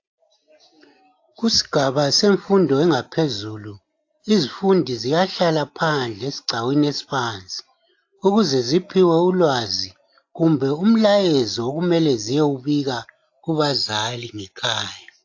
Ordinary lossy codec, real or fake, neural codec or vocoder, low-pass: AAC, 48 kbps; real; none; 7.2 kHz